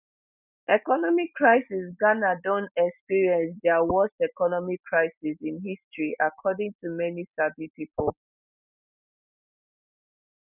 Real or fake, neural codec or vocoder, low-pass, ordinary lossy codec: real; none; 3.6 kHz; none